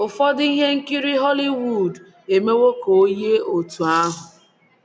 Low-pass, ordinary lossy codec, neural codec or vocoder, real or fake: none; none; none; real